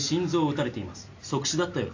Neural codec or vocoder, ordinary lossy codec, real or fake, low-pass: none; AAC, 48 kbps; real; 7.2 kHz